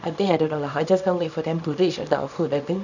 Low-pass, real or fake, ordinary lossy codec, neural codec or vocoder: 7.2 kHz; fake; none; codec, 24 kHz, 0.9 kbps, WavTokenizer, small release